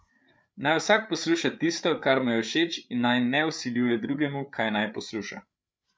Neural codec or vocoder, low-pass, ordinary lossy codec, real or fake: codec, 16 kHz, 8 kbps, FreqCodec, larger model; none; none; fake